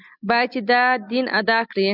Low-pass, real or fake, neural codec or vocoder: 5.4 kHz; real; none